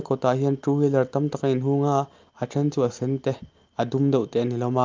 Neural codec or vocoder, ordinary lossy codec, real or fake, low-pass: none; Opus, 24 kbps; real; 7.2 kHz